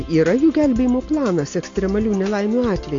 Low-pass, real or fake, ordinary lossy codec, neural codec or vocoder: 7.2 kHz; real; AAC, 48 kbps; none